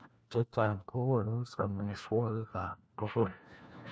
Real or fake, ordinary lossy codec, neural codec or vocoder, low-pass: fake; none; codec, 16 kHz, 1 kbps, FunCodec, trained on LibriTTS, 50 frames a second; none